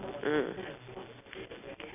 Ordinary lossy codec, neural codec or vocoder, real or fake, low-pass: none; none; real; 3.6 kHz